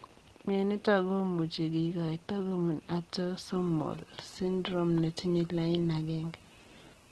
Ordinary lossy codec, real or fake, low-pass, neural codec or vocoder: Opus, 16 kbps; real; 10.8 kHz; none